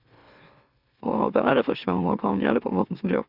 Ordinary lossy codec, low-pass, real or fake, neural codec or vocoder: Opus, 64 kbps; 5.4 kHz; fake; autoencoder, 44.1 kHz, a latent of 192 numbers a frame, MeloTTS